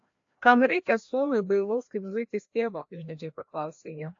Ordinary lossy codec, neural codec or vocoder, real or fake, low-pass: AAC, 48 kbps; codec, 16 kHz, 1 kbps, FreqCodec, larger model; fake; 7.2 kHz